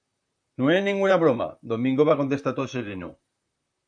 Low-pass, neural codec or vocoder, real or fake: 9.9 kHz; vocoder, 44.1 kHz, 128 mel bands, Pupu-Vocoder; fake